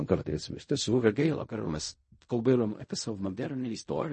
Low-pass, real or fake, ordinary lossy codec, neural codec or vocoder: 10.8 kHz; fake; MP3, 32 kbps; codec, 16 kHz in and 24 kHz out, 0.4 kbps, LongCat-Audio-Codec, fine tuned four codebook decoder